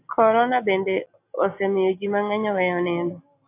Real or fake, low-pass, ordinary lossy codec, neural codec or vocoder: real; 3.6 kHz; AAC, 24 kbps; none